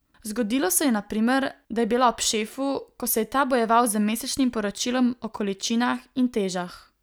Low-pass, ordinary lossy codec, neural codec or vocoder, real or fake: none; none; none; real